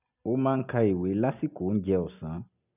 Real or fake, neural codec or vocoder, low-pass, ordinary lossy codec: real; none; 3.6 kHz; none